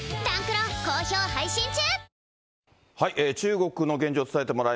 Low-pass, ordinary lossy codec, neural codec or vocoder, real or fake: none; none; none; real